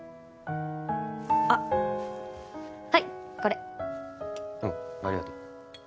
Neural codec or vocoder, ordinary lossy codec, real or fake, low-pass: none; none; real; none